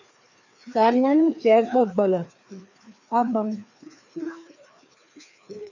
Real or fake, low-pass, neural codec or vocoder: fake; 7.2 kHz; codec, 16 kHz, 2 kbps, FreqCodec, larger model